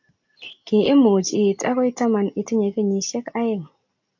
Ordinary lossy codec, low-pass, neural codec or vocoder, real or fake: AAC, 48 kbps; 7.2 kHz; none; real